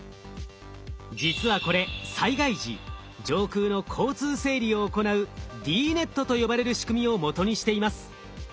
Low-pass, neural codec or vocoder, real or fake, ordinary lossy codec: none; none; real; none